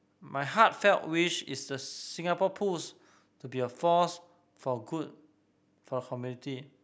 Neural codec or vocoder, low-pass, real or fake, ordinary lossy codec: none; none; real; none